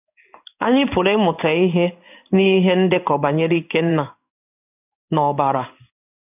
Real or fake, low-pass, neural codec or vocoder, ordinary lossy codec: fake; 3.6 kHz; codec, 16 kHz in and 24 kHz out, 1 kbps, XY-Tokenizer; none